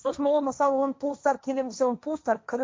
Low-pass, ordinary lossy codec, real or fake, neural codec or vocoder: none; none; fake; codec, 16 kHz, 1.1 kbps, Voila-Tokenizer